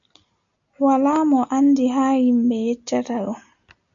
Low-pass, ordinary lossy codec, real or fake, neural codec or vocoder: 7.2 kHz; MP3, 64 kbps; real; none